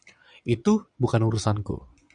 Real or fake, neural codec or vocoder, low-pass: fake; vocoder, 22.05 kHz, 80 mel bands, Vocos; 9.9 kHz